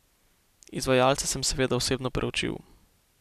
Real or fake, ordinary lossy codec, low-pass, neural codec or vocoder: real; none; 14.4 kHz; none